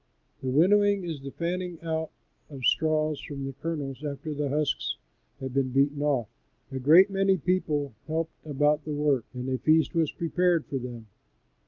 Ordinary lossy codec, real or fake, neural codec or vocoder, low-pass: Opus, 32 kbps; real; none; 7.2 kHz